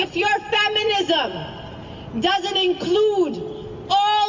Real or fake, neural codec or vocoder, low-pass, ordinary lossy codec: real; none; 7.2 kHz; MP3, 64 kbps